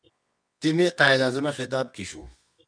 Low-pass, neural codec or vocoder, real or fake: 9.9 kHz; codec, 24 kHz, 0.9 kbps, WavTokenizer, medium music audio release; fake